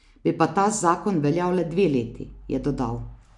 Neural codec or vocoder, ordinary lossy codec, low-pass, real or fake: none; none; 10.8 kHz; real